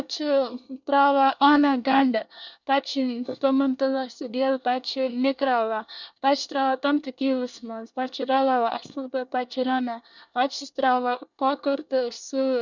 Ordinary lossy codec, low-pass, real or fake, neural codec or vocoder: none; 7.2 kHz; fake; codec, 24 kHz, 1 kbps, SNAC